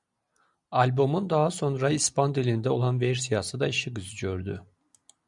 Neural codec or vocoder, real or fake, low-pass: none; real; 10.8 kHz